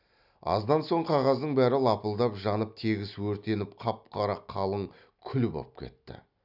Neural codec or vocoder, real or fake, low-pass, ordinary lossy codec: none; real; 5.4 kHz; none